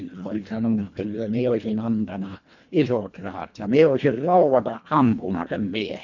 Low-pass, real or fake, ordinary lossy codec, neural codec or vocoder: 7.2 kHz; fake; none; codec, 24 kHz, 1.5 kbps, HILCodec